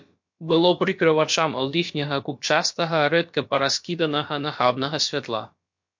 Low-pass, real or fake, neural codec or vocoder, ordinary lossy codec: 7.2 kHz; fake; codec, 16 kHz, about 1 kbps, DyCAST, with the encoder's durations; MP3, 48 kbps